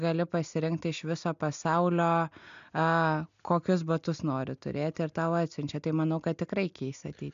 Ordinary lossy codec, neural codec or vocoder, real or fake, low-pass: MP3, 64 kbps; none; real; 7.2 kHz